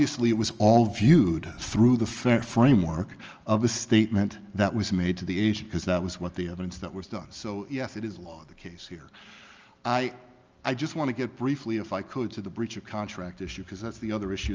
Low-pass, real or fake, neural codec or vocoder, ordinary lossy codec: 7.2 kHz; real; none; Opus, 24 kbps